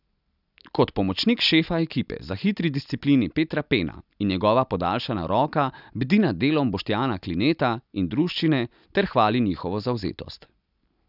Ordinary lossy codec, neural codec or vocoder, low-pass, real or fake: none; none; 5.4 kHz; real